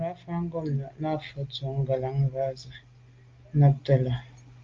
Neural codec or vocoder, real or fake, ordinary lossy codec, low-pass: none; real; Opus, 32 kbps; 7.2 kHz